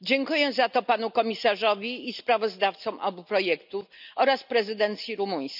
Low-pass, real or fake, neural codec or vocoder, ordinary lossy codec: 5.4 kHz; real; none; none